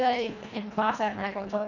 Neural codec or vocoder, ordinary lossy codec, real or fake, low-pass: codec, 24 kHz, 1.5 kbps, HILCodec; none; fake; 7.2 kHz